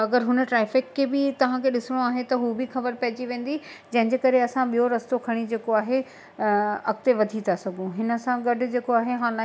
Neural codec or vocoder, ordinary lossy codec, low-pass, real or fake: none; none; none; real